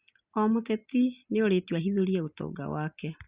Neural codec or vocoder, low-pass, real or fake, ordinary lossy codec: none; 3.6 kHz; real; Opus, 64 kbps